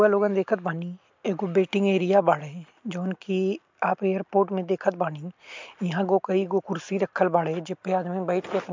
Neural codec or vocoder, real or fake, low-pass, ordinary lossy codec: none; real; 7.2 kHz; MP3, 64 kbps